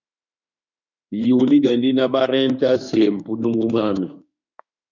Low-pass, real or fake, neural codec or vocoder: 7.2 kHz; fake; autoencoder, 48 kHz, 32 numbers a frame, DAC-VAE, trained on Japanese speech